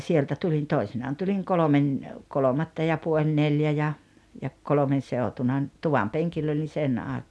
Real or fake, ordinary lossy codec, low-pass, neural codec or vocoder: real; none; none; none